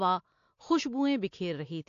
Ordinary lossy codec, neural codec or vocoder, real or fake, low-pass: AAC, 48 kbps; none; real; 7.2 kHz